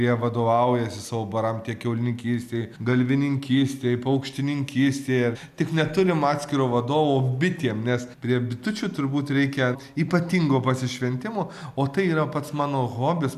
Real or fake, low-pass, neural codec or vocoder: real; 14.4 kHz; none